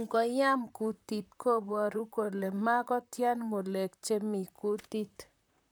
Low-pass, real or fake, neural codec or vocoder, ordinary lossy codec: none; fake; vocoder, 44.1 kHz, 128 mel bands, Pupu-Vocoder; none